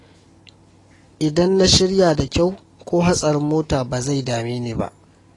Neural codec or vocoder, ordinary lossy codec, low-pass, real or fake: none; AAC, 32 kbps; 10.8 kHz; real